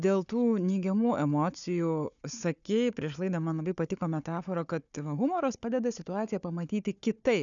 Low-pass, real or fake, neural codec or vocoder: 7.2 kHz; fake; codec, 16 kHz, 4 kbps, FunCodec, trained on Chinese and English, 50 frames a second